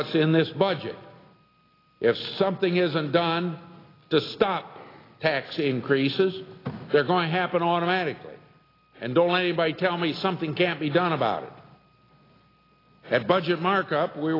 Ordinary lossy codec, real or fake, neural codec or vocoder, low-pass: AAC, 24 kbps; real; none; 5.4 kHz